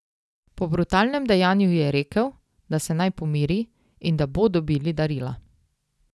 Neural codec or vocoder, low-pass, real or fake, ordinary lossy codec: none; none; real; none